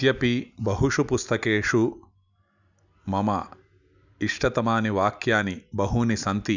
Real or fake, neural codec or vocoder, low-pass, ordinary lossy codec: real; none; 7.2 kHz; none